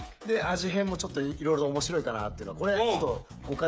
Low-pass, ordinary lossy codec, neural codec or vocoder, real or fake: none; none; codec, 16 kHz, 8 kbps, FreqCodec, smaller model; fake